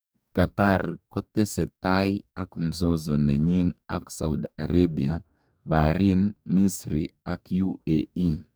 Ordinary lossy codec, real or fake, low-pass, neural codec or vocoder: none; fake; none; codec, 44.1 kHz, 2.6 kbps, DAC